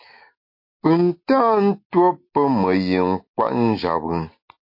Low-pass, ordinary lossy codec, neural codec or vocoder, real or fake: 5.4 kHz; MP3, 32 kbps; none; real